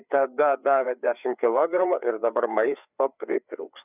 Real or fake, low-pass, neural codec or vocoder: fake; 3.6 kHz; codec, 16 kHz, 4 kbps, FreqCodec, larger model